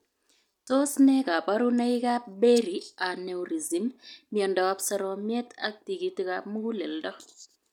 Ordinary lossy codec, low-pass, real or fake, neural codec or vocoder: none; 19.8 kHz; real; none